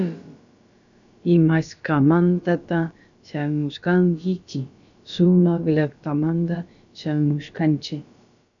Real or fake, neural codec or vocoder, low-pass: fake; codec, 16 kHz, about 1 kbps, DyCAST, with the encoder's durations; 7.2 kHz